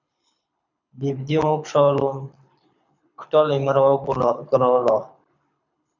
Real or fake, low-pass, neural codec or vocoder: fake; 7.2 kHz; codec, 24 kHz, 6 kbps, HILCodec